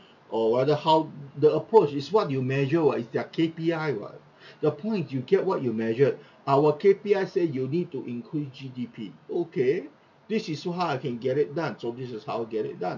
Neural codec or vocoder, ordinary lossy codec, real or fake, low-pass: none; none; real; 7.2 kHz